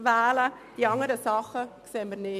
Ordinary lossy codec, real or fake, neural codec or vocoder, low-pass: none; real; none; 14.4 kHz